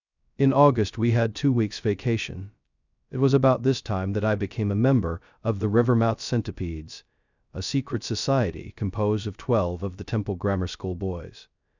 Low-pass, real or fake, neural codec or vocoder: 7.2 kHz; fake; codec, 16 kHz, 0.2 kbps, FocalCodec